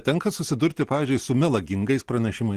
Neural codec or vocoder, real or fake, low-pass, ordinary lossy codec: none; real; 14.4 kHz; Opus, 16 kbps